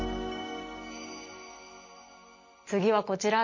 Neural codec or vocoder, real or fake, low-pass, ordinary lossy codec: none; real; 7.2 kHz; MP3, 32 kbps